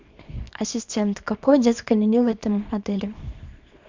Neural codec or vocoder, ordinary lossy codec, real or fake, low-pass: codec, 24 kHz, 0.9 kbps, WavTokenizer, small release; MP3, 64 kbps; fake; 7.2 kHz